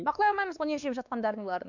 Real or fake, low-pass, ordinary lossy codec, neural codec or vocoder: fake; 7.2 kHz; none; codec, 16 kHz, 2 kbps, X-Codec, WavLM features, trained on Multilingual LibriSpeech